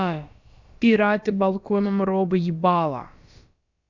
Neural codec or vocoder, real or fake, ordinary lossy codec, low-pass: codec, 16 kHz, about 1 kbps, DyCAST, with the encoder's durations; fake; Opus, 64 kbps; 7.2 kHz